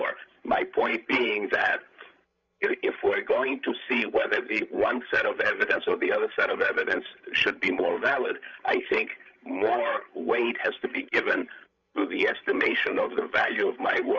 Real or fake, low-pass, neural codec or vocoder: fake; 7.2 kHz; codec, 16 kHz, 16 kbps, FreqCodec, larger model